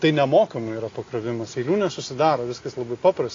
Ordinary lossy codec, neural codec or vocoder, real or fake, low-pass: AAC, 32 kbps; none; real; 7.2 kHz